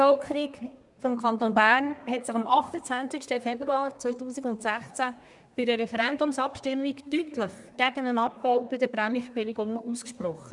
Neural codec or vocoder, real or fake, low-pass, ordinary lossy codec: codec, 24 kHz, 1 kbps, SNAC; fake; 10.8 kHz; none